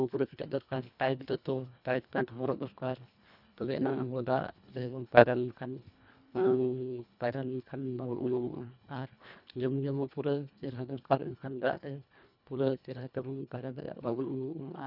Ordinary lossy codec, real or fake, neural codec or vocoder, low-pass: none; fake; codec, 24 kHz, 1.5 kbps, HILCodec; 5.4 kHz